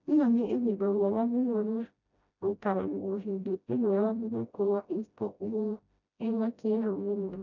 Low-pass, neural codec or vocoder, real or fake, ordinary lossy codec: 7.2 kHz; codec, 16 kHz, 0.5 kbps, FreqCodec, smaller model; fake; none